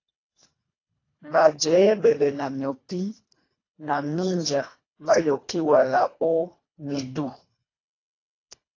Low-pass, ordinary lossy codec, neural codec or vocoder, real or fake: 7.2 kHz; AAC, 32 kbps; codec, 24 kHz, 1.5 kbps, HILCodec; fake